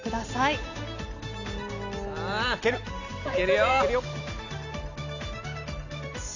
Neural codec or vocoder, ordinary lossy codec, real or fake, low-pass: none; none; real; 7.2 kHz